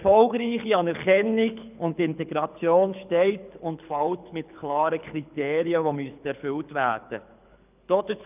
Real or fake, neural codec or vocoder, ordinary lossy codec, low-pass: fake; codec, 24 kHz, 6 kbps, HILCodec; none; 3.6 kHz